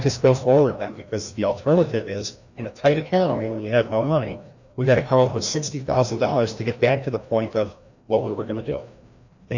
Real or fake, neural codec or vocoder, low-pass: fake; codec, 16 kHz, 1 kbps, FreqCodec, larger model; 7.2 kHz